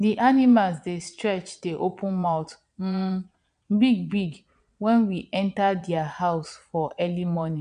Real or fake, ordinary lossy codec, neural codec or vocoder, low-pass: fake; none; vocoder, 22.05 kHz, 80 mel bands, Vocos; 9.9 kHz